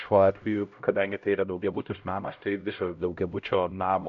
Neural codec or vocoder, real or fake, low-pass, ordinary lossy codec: codec, 16 kHz, 0.5 kbps, X-Codec, HuBERT features, trained on LibriSpeech; fake; 7.2 kHz; MP3, 64 kbps